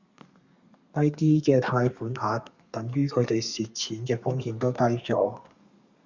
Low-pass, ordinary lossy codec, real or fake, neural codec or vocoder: 7.2 kHz; Opus, 64 kbps; fake; codec, 32 kHz, 1.9 kbps, SNAC